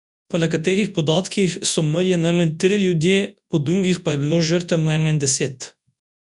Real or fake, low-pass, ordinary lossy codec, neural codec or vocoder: fake; 10.8 kHz; none; codec, 24 kHz, 0.9 kbps, WavTokenizer, large speech release